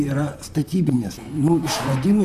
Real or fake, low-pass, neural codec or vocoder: fake; 14.4 kHz; vocoder, 44.1 kHz, 128 mel bands, Pupu-Vocoder